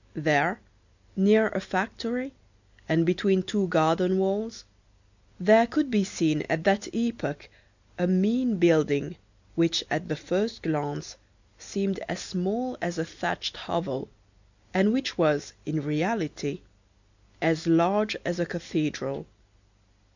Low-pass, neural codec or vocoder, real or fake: 7.2 kHz; none; real